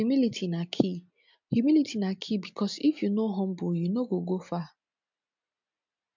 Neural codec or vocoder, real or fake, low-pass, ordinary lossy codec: none; real; 7.2 kHz; MP3, 64 kbps